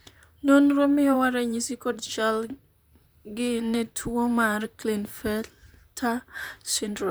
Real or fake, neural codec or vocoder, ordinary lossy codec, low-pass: fake; vocoder, 44.1 kHz, 128 mel bands, Pupu-Vocoder; none; none